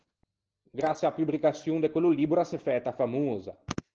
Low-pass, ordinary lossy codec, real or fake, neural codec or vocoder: 7.2 kHz; Opus, 16 kbps; real; none